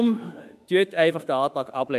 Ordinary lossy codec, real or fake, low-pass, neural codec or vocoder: none; fake; 14.4 kHz; autoencoder, 48 kHz, 32 numbers a frame, DAC-VAE, trained on Japanese speech